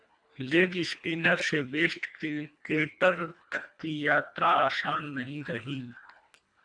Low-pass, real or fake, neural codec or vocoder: 9.9 kHz; fake; codec, 24 kHz, 1.5 kbps, HILCodec